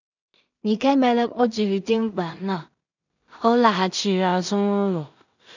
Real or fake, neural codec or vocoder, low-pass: fake; codec, 16 kHz in and 24 kHz out, 0.4 kbps, LongCat-Audio-Codec, two codebook decoder; 7.2 kHz